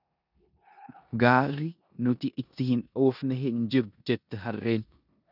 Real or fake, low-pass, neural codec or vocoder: fake; 5.4 kHz; codec, 16 kHz in and 24 kHz out, 0.9 kbps, LongCat-Audio-Codec, four codebook decoder